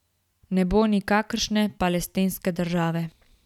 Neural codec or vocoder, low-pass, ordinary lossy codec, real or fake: none; 19.8 kHz; none; real